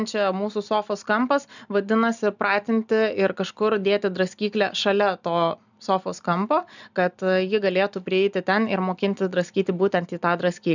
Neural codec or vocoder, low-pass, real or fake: none; 7.2 kHz; real